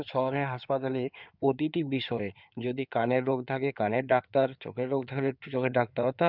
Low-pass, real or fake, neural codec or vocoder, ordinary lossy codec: 5.4 kHz; fake; codec, 16 kHz in and 24 kHz out, 2.2 kbps, FireRedTTS-2 codec; none